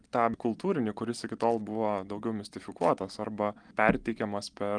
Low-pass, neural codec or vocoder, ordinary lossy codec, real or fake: 9.9 kHz; none; Opus, 32 kbps; real